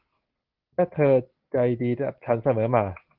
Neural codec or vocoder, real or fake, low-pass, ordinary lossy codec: none; real; 5.4 kHz; Opus, 16 kbps